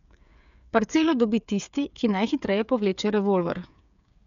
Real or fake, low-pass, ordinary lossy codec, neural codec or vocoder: fake; 7.2 kHz; none; codec, 16 kHz, 8 kbps, FreqCodec, smaller model